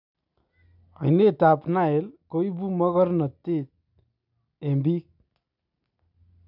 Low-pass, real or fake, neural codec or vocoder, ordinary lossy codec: 5.4 kHz; real; none; none